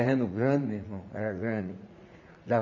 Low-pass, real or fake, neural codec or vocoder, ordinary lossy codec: 7.2 kHz; fake; vocoder, 44.1 kHz, 80 mel bands, Vocos; none